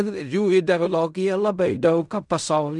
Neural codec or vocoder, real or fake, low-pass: codec, 16 kHz in and 24 kHz out, 0.4 kbps, LongCat-Audio-Codec, fine tuned four codebook decoder; fake; 10.8 kHz